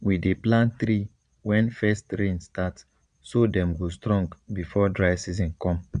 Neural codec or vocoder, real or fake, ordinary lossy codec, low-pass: vocoder, 22.05 kHz, 80 mel bands, Vocos; fake; none; 9.9 kHz